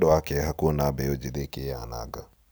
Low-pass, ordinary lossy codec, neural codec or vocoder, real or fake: none; none; none; real